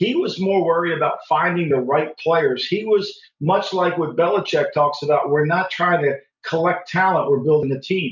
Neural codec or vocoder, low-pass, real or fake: none; 7.2 kHz; real